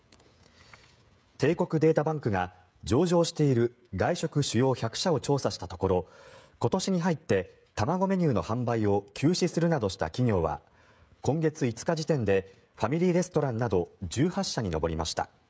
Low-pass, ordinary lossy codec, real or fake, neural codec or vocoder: none; none; fake; codec, 16 kHz, 16 kbps, FreqCodec, smaller model